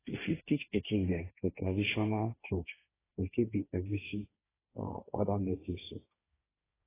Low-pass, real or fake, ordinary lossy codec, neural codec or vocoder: 3.6 kHz; fake; AAC, 16 kbps; codec, 16 kHz, 1.1 kbps, Voila-Tokenizer